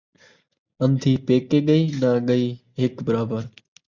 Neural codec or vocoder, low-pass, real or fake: none; 7.2 kHz; real